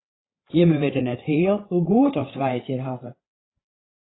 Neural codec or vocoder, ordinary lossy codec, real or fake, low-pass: codec, 16 kHz, 8 kbps, FreqCodec, larger model; AAC, 16 kbps; fake; 7.2 kHz